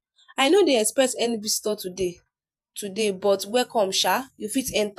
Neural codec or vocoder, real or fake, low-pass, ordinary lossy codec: vocoder, 48 kHz, 128 mel bands, Vocos; fake; 14.4 kHz; none